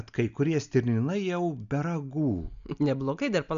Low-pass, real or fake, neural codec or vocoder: 7.2 kHz; real; none